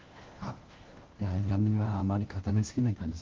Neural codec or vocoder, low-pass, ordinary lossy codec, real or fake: codec, 16 kHz, 1 kbps, FunCodec, trained on LibriTTS, 50 frames a second; 7.2 kHz; Opus, 16 kbps; fake